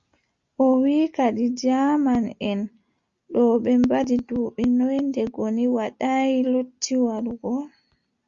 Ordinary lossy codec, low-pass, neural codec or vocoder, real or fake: Opus, 64 kbps; 7.2 kHz; none; real